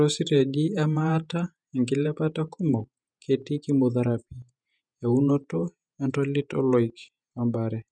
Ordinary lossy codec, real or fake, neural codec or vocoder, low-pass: none; fake; vocoder, 48 kHz, 128 mel bands, Vocos; 9.9 kHz